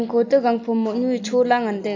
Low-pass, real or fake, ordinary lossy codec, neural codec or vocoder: 7.2 kHz; real; AAC, 48 kbps; none